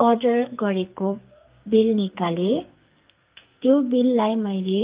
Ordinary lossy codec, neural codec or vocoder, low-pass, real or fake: Opus, 32 kbps; codec, 44.1 kHz, 2.6 kbps, SNAC; 3.6 kHz; fake